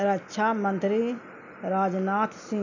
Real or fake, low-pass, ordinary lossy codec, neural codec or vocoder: real; 7.2 kHz; none; none